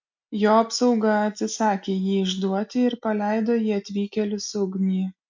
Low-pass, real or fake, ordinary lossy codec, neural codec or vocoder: 7.2 kHz; real; MP3, 48 kbps; none